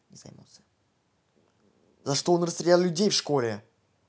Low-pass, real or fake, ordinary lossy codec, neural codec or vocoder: none; real; none; none